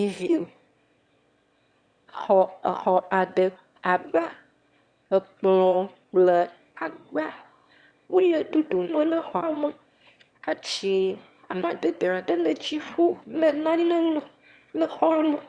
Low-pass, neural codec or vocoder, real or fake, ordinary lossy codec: 9.9 kHz; autoencoder, 22.05 kHz, a latent of 192 numbers a frame, VITS, trained on one speaker; fake; Opus, 64 kbps